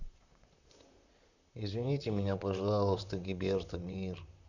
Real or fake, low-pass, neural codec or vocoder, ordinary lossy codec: fake; 7.2 kHz; vocoder, 44.1 kHz, 128 mel bands, Pupu-Vocoder; none